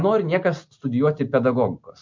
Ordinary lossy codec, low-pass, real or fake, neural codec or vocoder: MP3, 48 kbps; 7.2 kHz; real; none